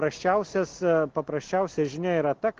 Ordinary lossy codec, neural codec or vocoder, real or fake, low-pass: Opus, 16 kbps; none; real; 7.2 kHz